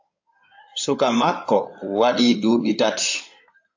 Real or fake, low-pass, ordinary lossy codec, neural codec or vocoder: fake; 7.2 kHz; AAC, 48 kbps; codec, 16 kHz in and 24 kHz out, 2.2 kbps, FireRedTTS-2 codec